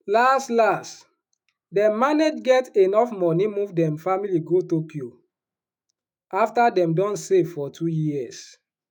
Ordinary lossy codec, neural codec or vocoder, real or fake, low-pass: none; autoencoder, 48 kHz, 128 numbers a frame, DAC-VAE, trained on Japanese speech; fake; 19.8 kHz